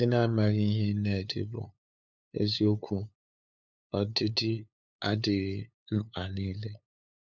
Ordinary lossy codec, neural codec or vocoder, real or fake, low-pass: none; codec, 16 kHz, 8 kbps, FunCodec, trained on LibriTTS, 25 frames a second; fake; 7.2 kHz